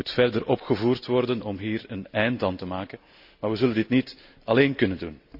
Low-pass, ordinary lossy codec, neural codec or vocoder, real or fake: 5.4 kHz; none; none; real